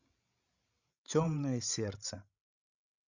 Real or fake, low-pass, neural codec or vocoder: fake; 7.2 kHz; codec, 16 kHz, 16 kbps, FreqCodec, larger model